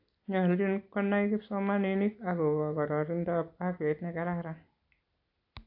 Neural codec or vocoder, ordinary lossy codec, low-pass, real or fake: none; AAC, 32 kbps; 5.4 kHz; real